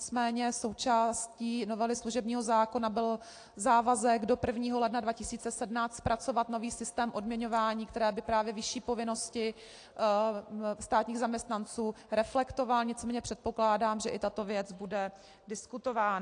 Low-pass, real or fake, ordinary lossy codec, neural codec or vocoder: 9.9 kHz; real; AAC, 48 kbps; none